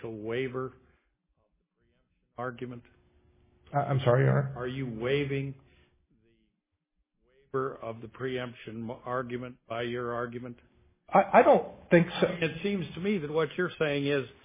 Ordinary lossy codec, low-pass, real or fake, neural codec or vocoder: MP3, 16 kbps; 3.6 kHz; real; none